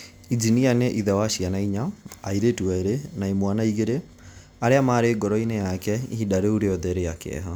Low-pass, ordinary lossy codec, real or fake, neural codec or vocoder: none; none; real; none